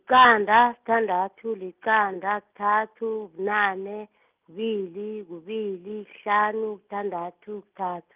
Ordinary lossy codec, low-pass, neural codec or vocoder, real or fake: Opus, 24 kbps; 3.6 kHz; none; real